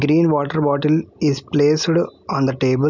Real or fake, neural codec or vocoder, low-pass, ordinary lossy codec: real; none; 7.2 kHz; none